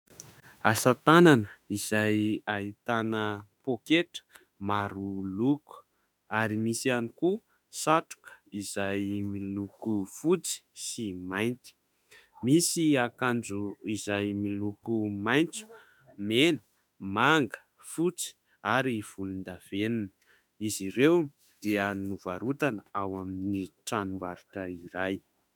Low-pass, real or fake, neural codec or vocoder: 19.8 kHz; fake; autoencoder, 48 kHz, 32 numbers a frame, DAC-VAE, trained on Japanese speech